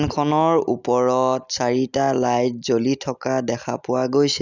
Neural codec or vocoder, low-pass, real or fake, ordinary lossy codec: none; 7.2 kHz; real; none